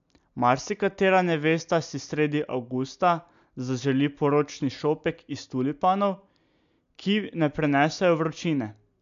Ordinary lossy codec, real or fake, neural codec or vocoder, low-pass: MP3, 64 kbps; real; none; 7.2 kHz